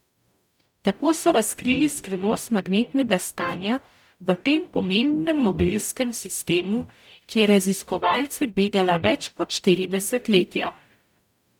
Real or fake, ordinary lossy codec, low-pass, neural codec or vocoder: fake; none; 19.8 kHz; codec, 44.1 kHz, 0.9 kbps, DAC